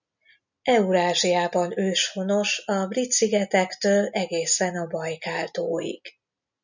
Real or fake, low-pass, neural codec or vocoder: real; 7.2 kHz; none